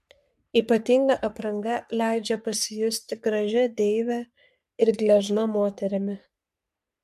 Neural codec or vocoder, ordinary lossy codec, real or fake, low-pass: codec, 44.1 kHz, 3.4 kbps, Pupu-Codec; MP3, 96 kbps; fake; 14.4 kHz